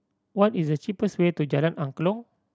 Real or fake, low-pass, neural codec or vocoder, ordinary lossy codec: real; none; none; none